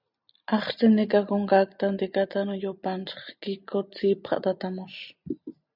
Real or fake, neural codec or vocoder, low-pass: real; none; 5.4 kHz